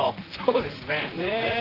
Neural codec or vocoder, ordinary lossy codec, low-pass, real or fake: vocoder, 44.1 kHz, 128 mel bands, Pupu-Vocoder; Opus, 16 kbps; 5.4 kHz; fake